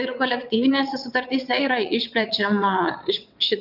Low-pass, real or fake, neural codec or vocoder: 5.4 kHz; fake; vocoder, 22.05 kHz, 80 mel bands, WaveNeXt